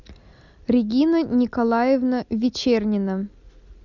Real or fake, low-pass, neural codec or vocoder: real; 7.2 kHz; none